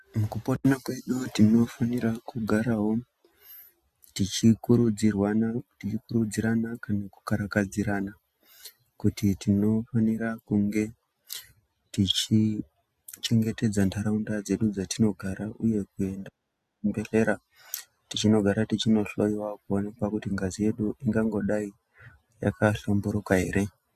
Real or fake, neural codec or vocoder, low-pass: real; none; 14.4 kHz